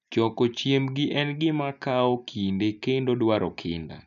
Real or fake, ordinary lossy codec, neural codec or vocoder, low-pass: real; none; none; 7.2 kHz